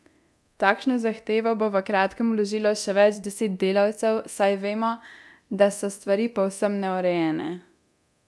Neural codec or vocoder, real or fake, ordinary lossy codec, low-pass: codec, 24 kHz, 0.9 kbps, DualCodec; fake; none; none